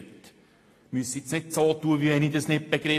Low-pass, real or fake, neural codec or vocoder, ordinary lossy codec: 14.4 kHz; real; none; AAC, 48 kbps